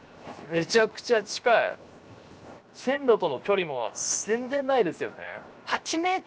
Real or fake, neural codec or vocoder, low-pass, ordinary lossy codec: fake; codec, 16 kHz, 0.7 kbps, FocalCodec; none; none